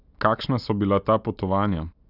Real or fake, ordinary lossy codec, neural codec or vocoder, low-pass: real; none; none; 5.4 kHz